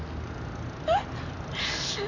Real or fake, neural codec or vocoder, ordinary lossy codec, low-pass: real; none; none; 7.2 kHz